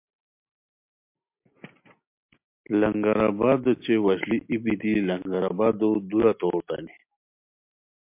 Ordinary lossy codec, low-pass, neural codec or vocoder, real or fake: MP3, 32 kbps; 3.6 kHz; none; real